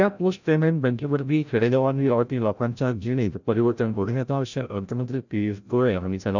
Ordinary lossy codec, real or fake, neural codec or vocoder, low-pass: none; fake; codec, 16 kHz, 0.5 kbps, FreqCodec, larger model; 7.2 kHz